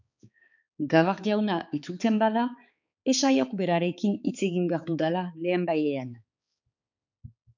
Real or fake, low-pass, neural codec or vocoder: fake; 7.2 kHz; codec, 16 kHz, 4 kbps, X-Codec, HuBERT features, trained on balanced general audio